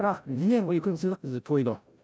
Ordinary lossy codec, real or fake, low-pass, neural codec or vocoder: none; fake; none; codec, 16 kHz, 0.5 kbps, FreqCodec, larger model